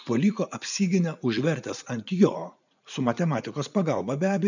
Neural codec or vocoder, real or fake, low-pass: vocoder, 44.1 kHz, 80 mel bands, Vocos; fake; 7.2 kHz